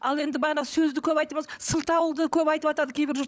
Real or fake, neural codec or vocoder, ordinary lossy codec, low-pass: fake; codec, 16 kHz, 16 kbps, FunCodec, trained on LibriTTS, 50 frames a second; none; none